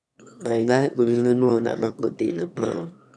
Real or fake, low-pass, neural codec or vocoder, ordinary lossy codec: fake; none; autoencoder, 22.05 kHz, a latent of 192 numbers a frame, VITS, trained on one speaker; none